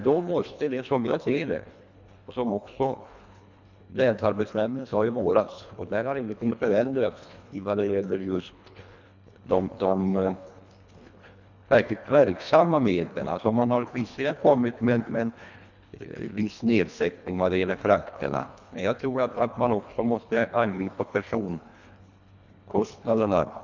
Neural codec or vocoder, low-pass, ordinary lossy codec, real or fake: codec, 24 kHz, 1.5 kbps, HILCodec; 7.2 kHz; none; fake